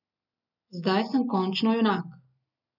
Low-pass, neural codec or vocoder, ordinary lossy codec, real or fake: 5.4 kHz; none; none; real